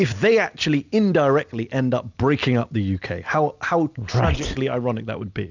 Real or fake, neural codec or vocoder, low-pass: real; none; 7.2 kHz